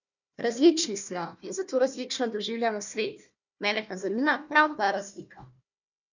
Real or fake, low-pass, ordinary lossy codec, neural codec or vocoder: fake; 7.2 kHz; none; codec, 16 kHz, 1 kbps, FunCodec, trained on Chinese and English, 50 frames a second